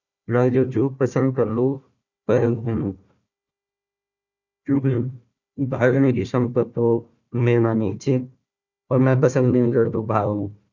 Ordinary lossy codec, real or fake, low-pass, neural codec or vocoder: none; fake; 7.2 kHz; codec, 16 kHz, 1 kbps, FunCodec, trained on Chinese and English, 50 frames a second